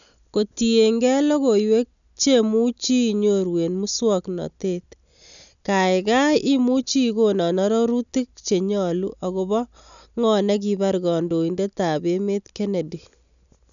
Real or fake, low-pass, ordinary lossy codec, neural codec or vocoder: real; 7.2 kHz; none; none